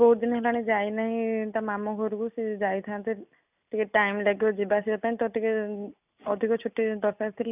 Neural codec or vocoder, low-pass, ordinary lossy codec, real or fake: none; 3.6 kHz; none; real